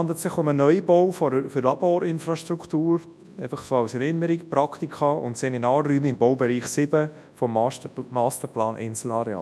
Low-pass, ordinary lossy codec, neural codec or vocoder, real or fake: none; none; codec, 24 kHz, 0.9 kbps, WavTokenizer, large speech release; fake